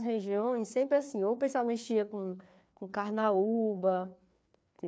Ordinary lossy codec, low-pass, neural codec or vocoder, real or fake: none; none; codec, 16 kHz, 2 kbps, FreqCodec, larger model; fake